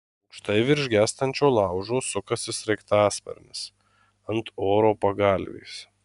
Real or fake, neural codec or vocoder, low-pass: real; none; 10.8 kHz